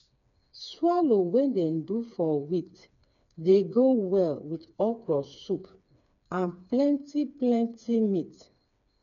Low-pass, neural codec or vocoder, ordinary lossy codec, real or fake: 7.2 kHz; codec, 16 kHz, 4 kbps, FreqCodec, smaller model; none; fake